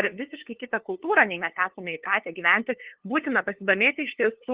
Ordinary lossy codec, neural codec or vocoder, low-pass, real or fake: Opus, 16 kbps; codec, 16 kHz, 2 kbps, FunCodec, trained on LibriTTS, 25 frames a second; 3.6 kHz; fake